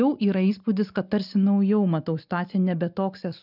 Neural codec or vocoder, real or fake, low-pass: none; real; 5.4 kHz